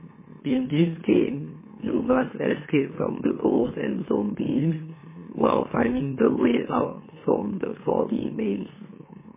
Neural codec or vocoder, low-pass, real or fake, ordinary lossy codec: autoencoder, 44.1 kHz, a latent of 192 numbers a frame, MeloTTS; 3.6 kHz; fake; MP3, 16 kbps